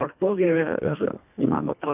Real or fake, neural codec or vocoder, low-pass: fake; codec, 24 kHz, 1.5 kbps, HILCodec; 3.6 kHz